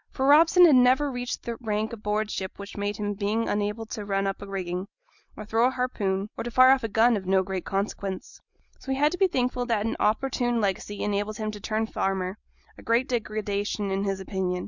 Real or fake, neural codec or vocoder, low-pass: real; none; 7.2 kHz